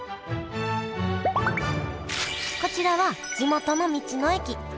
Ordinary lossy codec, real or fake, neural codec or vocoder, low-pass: none; real; none; none